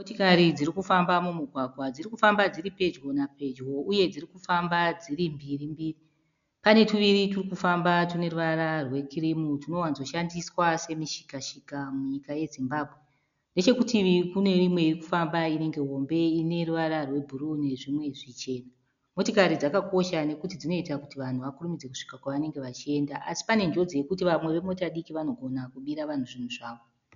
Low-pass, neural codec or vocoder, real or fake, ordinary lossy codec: 7.2 kHz; none; real; MP3, 96 kbps